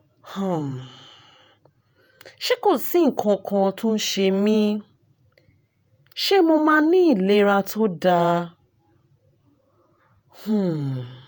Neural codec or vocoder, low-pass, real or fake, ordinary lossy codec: vocoder, 48 kHz, 128 mel bands, Vocos; none; fake; none